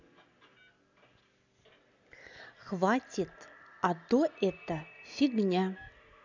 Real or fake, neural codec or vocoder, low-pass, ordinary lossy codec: real; none; 7.2 kHz; none